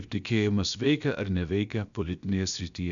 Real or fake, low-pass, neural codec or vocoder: fake; 7.2 kHz; codec, 16 kHz, about 1 kbps, DyCAST, with the encoder's durations